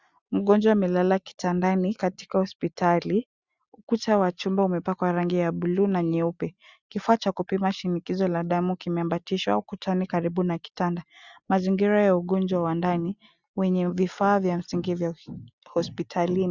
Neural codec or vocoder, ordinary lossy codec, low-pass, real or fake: none; Opus, 64 kbps; 7.2 kHz; real